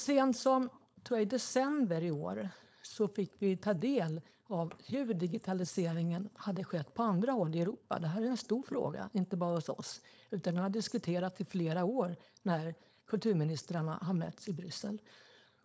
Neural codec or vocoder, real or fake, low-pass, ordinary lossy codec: codec, 16 kHz, 4.8 kbps, FACodec; fake; none; none